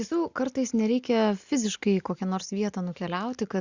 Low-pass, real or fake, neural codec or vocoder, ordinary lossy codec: 7.2 kHz; real; none; Opus, 64 kbps